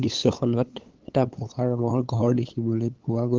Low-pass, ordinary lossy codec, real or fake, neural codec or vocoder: 7.2 kHz; Opus, 16 kbps; fake; codec, 16 kHz, 8 kbps, FunCodec, trained on LibriTTS, 25 frames a second